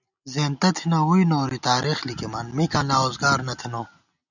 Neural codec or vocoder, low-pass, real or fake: none; 7.2 kHz; real